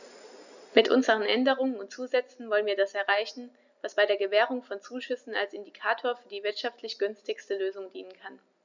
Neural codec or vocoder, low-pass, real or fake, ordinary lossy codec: none; 7.2 kHz; real; none